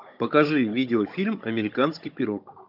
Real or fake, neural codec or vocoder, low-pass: fake; codec, 16 kHz, 16 kbps, FunCodec, trained on Chinese and English, 50 frames a second; 5.4 kHz